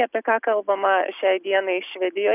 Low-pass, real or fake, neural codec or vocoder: 3.6 kHz; real; none